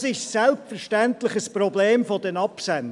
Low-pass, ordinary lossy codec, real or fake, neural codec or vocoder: 10.8 kHz; MP3, 96 kbps; real; none